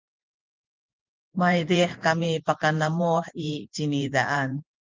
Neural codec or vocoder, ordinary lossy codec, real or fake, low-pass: vocoder, 44.1 kHz, 128 mel bands every 512 samples, BigVGAN v2; Opus, 32 kbps; fake; 7.2 kHz